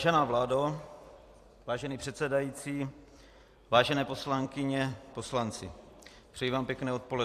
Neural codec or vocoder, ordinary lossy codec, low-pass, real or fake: vocoder, 44.1 kHz, 128 mel bands every 256 samples, BigVGAN v2; AAC, 64 kbps; 14.4 kHz; fake